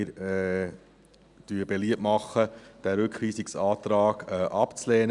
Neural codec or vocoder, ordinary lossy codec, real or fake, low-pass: none; none; real; 10.8 kHz